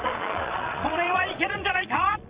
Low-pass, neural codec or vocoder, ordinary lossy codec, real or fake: 3.6 kHz; vocoder, 22.05 kHz, 80 mel bands, Vocos; Opus, 64 kbps; fake